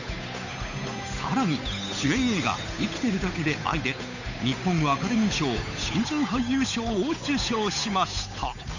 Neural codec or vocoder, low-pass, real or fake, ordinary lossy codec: codec, 16 kHz, 8 kbps, FunCodec, trained on Chinese and English, 25 frames a second; 7.2 kHz; fake; none